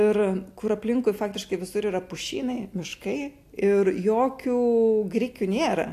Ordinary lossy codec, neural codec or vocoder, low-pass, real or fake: AAC, 64 kbps; none; 14.4 kHz; real